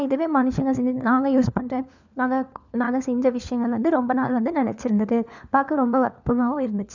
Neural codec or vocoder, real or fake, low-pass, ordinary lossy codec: codec, 16 kHz, 4 kbps, FunCodec, trained on LibriTTS, 50 frames a second; fake; 7.2 kHz; none